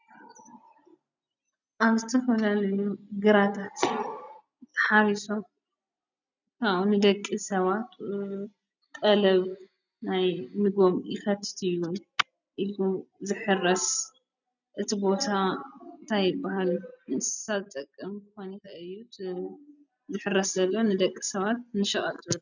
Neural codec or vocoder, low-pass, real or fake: none; 7.2 kHz; real